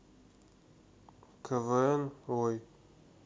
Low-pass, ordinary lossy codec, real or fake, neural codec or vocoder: none; none; real; none